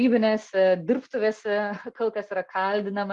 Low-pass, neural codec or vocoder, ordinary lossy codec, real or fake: 10.8 kHz; none; Opus, 24 kbps; real